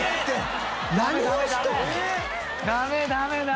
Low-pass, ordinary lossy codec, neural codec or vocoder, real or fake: none; none; none; real